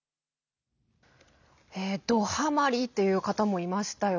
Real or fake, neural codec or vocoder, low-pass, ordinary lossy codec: real; none; 7.2 kHz; none